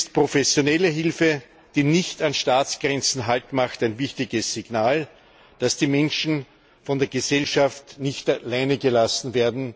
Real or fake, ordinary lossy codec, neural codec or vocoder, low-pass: real; none; none; none